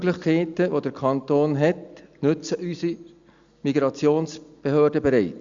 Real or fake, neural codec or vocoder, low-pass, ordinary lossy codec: real; none; 7.2 kHz; Opus, 64 kbps